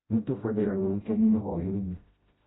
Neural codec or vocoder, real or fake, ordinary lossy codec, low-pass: codec, 16 kHz, 0.5 kbps, FreqCodec, smaller model; fake; AAC, 16 kbps; 7.2 kHz